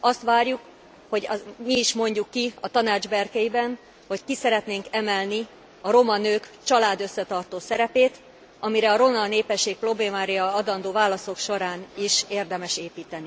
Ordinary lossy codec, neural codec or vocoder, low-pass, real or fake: none; none; none; real